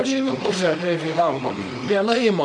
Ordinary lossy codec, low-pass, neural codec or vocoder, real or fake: MP3, 64 kbps; 9.9 kHz; codec, 24 kHz, 0.9 kbps, WavTokenizer, small release; fake